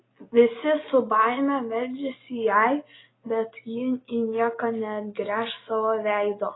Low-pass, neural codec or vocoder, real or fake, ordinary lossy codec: 7.2 kHz; none; real; AAC, 16 kbps